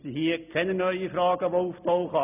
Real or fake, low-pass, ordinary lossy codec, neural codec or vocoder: real; 3.6 kHz; none; none